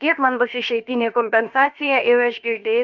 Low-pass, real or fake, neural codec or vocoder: 7.2 kHz; fake; codec, 16 kHz, about 1 kbps, DyCAST, with the encoder's durations